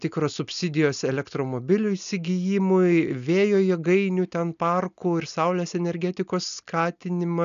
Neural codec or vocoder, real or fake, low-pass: none; real; 7.2 kHz